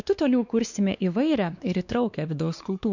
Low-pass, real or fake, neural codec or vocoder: 7.2 kHz; fake; codec, 16 kHz, 2 kbps, X-Codec, WavLM features, trained on Multilingual LibriSpeech